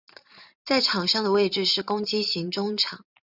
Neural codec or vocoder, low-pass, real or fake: vocoder, 44.1 kHz, 128 mel bands every 512 samples, BigVGAN v2; 5.4 kHz; fake